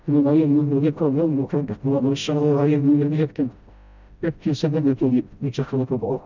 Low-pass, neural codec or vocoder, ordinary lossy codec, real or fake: 7.2 kHz; codec, 16 kHz, 0.5 kbps, FreqCodec, smaller model; none; fake